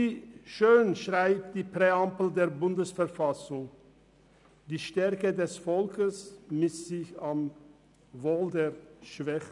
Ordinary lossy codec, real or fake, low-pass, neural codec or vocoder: none; real; 10.8 kHz; none